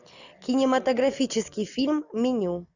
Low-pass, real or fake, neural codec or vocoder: 7.2 kHz; real; none